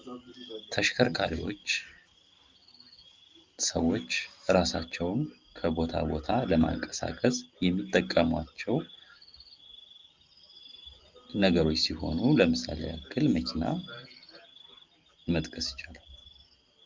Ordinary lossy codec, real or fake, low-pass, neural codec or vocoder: Opus, 32 kbps; real; 7.2 kHz; none